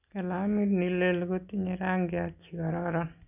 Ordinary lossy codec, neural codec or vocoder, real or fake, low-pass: none; none; real; 3.6 kHz